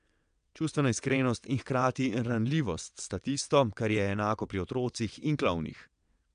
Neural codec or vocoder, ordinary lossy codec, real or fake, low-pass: vocoder, 22.05 kHz, 80 mel bands, WaveNeXt; MP3, 96 kbps; fake; 9.9 kHz